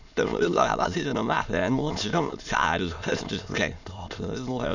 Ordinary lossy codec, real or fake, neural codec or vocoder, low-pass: none; fake; autoencoder, 22.05 kHz, a latent of 192 numbers a frame, VITS, trained on many speakers; 7.2 kHz